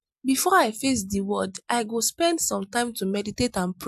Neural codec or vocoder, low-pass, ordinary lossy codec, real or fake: none; 14.4 kHz; MP3, 96 kbps; real